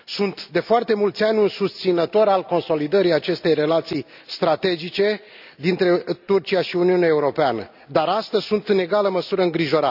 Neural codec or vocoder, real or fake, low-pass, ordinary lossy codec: none; real; 5.4 kHz; none